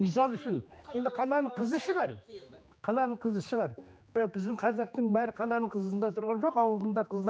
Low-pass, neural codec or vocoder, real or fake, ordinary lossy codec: none; codec, 16 kHz, 2 kbps, X-Codec, HuBERT features, trained on general audio; fake; none